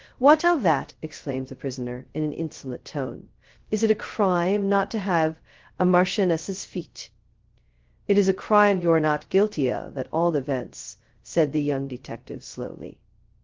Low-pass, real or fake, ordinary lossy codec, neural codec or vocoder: 7.2 kHz; fake; Opus, 16 kbps; codec, 16 kHz, 0.2 kbps, FocalCodec